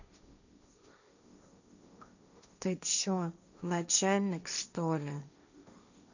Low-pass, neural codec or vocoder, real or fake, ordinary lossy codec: 7.2 kHz; codec, 16 kHz, 1.1 kbps, Voila-Tokenizer; fake; none